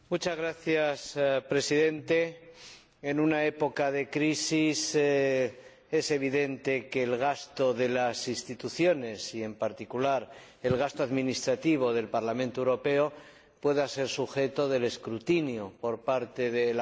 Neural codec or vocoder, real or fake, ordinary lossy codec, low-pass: none; real; none; none